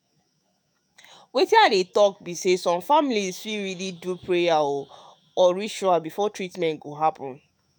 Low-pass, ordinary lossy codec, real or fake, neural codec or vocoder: none; none; fake; autoencoder, 48 kHz, 128 numbers a frame, DAC-VAE, trained on Japanese speech